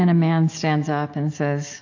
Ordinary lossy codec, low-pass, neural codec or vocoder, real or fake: AAC, 48 kbps; 7.2 kHz; none; real